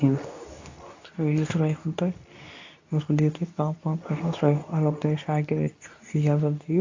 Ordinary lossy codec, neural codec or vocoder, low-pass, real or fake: AAC, 48 kbps; codec, 24 kHz, 0.9 kbps, WavTokenizer, medium speech release version 2; 7.2 kHz; fake